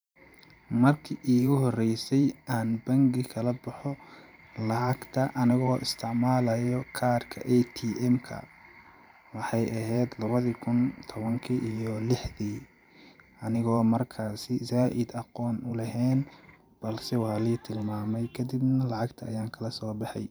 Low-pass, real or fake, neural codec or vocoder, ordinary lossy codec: none; fake; vocoder, 44.1 kHz, 128 mel bands every 512 samples, BigVGAN v2; none